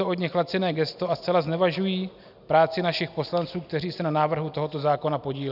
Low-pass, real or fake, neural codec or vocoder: 5.4 kHz; real; none